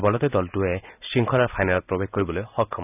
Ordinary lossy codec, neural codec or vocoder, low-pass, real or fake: none; none; 3.6 kHz; real